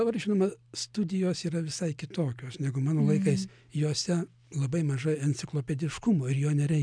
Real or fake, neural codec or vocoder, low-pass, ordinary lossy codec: real; none; 9.9 kHz; MP3, 96 kbps